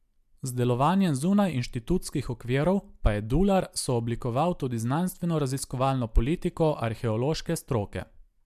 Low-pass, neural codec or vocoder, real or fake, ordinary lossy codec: 14.4 kHz; none; real; MP3, 96 kbps